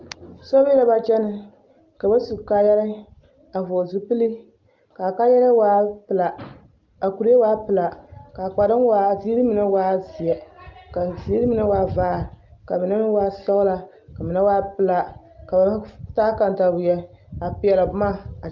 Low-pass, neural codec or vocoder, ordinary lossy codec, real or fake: 7.2 kHz; none; Opus, 24 kbps; real